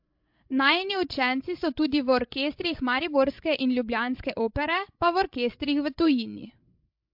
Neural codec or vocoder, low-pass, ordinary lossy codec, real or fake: codec, 16 kHz, 16 kbps, FreqCodec, larger model; 5.4 kHz; MP3, 48 kbps; fake